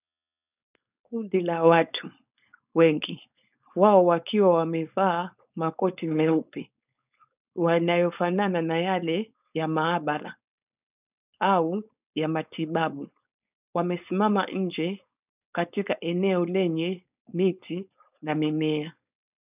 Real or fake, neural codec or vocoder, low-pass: fake; codec, 16 kHz, 4.8 kbps, FACodec; 3.6 kHz